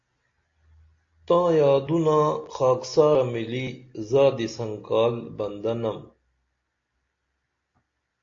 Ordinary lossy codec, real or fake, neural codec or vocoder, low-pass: AAC, 48 kbps; real; none; 7.2 kHz